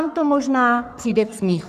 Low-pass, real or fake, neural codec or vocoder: 14.4 kHz; fake; codec, 44.1 kHz, 3.4 kbps, Pupu-Codec